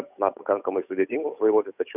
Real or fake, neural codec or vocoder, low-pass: fake; codec, 16 kHz, 8 kbps, FunCodec, trained on Chinese and English, 25 frames a second; 3.6 kHz